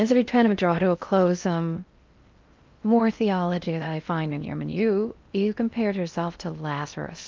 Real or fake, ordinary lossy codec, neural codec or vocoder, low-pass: fake; Opus, 24 kbps; codec, 16 kHz in and 24 kHz out, 0.6 kbps, FocalCodec, streaming, 4096 codes; 7.2 kHz